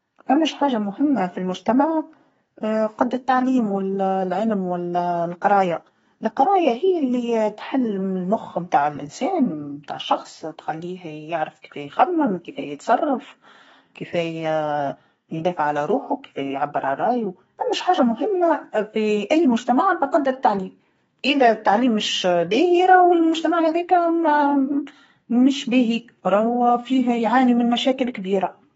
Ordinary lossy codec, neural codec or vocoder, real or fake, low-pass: AAC, 24 kbps; codec, 32 kHz, 1.9 kbps, SNAC; fake; 14.4 kHz